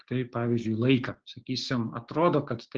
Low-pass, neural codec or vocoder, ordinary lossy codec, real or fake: 7.2 kHz; codec, 16 kHz, 6 kbps, DAC; Opus, 16 kbps; fake